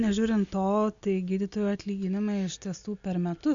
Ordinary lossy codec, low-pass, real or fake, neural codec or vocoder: MP3, 64 kbps; 7.2 kHz; real; none